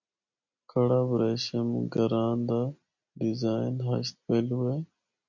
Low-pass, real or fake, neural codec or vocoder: 7.2 kHz; real; none